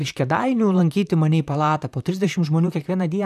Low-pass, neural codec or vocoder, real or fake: 14.4 kHz; vocoder, 44.1 kHz, 128 mel bands, Pupu-Vocoder; fake